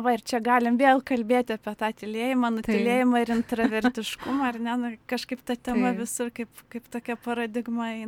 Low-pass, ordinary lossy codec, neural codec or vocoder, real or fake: 19.8 kHz; MP3, 96 kbps; none; real